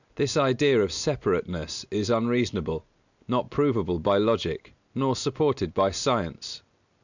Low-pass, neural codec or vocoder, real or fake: 7.2 kHz; none; real